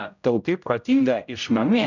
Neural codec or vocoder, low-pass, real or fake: codec, 16 kHz, 0.5 kbps, X-Codec, HuBERT features, trained on general audio; 7.2 kHz; fake